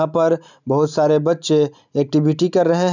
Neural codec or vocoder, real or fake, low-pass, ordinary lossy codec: none; real; 7.2 kHz; none